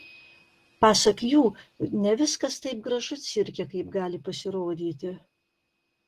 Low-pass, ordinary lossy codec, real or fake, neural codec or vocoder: 14.4 kHz; Opus, 16 kbps; real; none